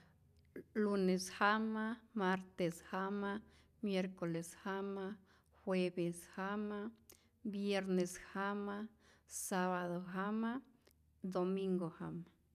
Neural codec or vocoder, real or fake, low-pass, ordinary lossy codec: none; real; 14.4 kHz; none